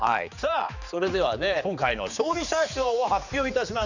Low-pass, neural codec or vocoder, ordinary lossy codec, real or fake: 7.2 kHz; codec, 16 kHz, 4 kbps, X-Codec, HuBERT features, trained on general audio; none; fake